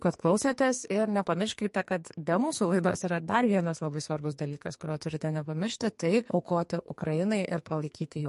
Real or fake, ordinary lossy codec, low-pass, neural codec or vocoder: fake; MP3, 48 kbps; 14.4 kHz; codec, 44.1 kHz, 2.6 kbps, SNAC